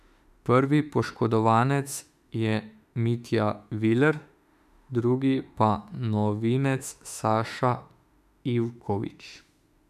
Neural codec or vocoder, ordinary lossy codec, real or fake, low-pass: autoencoder, 48 kHz, 32 numbers a frame, DAC-VAE, trained on Japanese speech; none; fake; 14.4 kHz